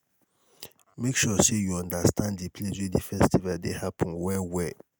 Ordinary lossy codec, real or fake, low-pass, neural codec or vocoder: none; real; none; none